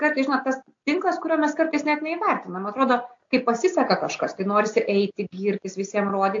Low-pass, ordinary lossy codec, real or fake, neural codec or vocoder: 7.2 kHz; AAC, 48 kbps; real; none